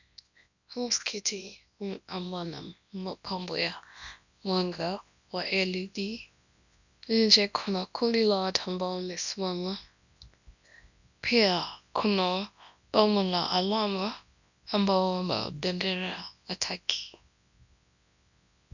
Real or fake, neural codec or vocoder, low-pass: fake; codec, 24 kHz, 0.9 kbps, WavTokenizer, large speech release; 7.2 kHz